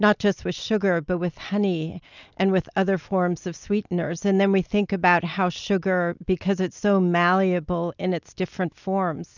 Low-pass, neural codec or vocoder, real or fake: 7.2 kHz; none; real